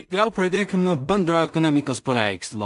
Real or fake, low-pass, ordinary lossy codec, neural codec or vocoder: fake; 10.8 kHz; MP3, 64 kbps; codec, 16 kHz in and 24 kHz out, 0.4 kbps, LongCat-Audio-Codec, two codebook decoder